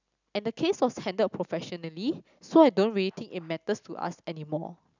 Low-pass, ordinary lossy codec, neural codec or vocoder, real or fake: 7.2 kHz; none; none; real